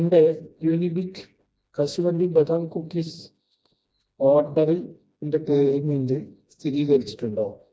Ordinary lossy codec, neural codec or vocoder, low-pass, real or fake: none; codec, 16 kHz, 1 kbps, FreqCodec, smaller model; none; fake